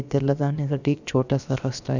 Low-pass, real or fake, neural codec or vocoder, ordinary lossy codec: 7.2 kHz; fake; codec, 16 kHz, about 1 kbps, DyCAST, with the encoder's durations; none